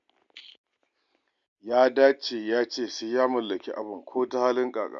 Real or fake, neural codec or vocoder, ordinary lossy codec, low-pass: real; none; MP3, 64 kbps; 7.2 kHz